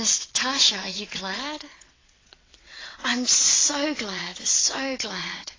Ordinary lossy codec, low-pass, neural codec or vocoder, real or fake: AAC, 32 kbps; 7.2 kHz; vocoder, 22.05 kHz, 80 mel bands, WaveNeXt; fake